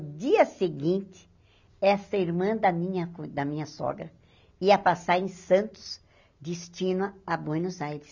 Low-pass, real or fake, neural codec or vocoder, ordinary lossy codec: 7.2 kHz; real; none; none